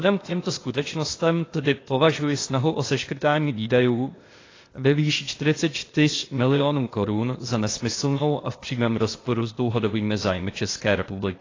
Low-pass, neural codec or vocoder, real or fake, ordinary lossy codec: 7.2 kHz; codec, 16 kHz, 0.8 kbps, ZipCodec; fake; AAC, 32 kbps